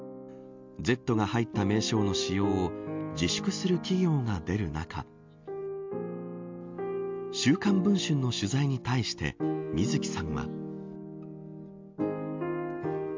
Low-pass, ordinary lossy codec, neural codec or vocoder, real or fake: 7.2 kHz; MP3, 64 kbps; none; real